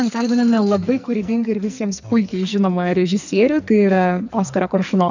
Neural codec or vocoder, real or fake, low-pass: codec, 44.1 kHz, 2.6 kbps, SNAC; fake; 7.2 kHz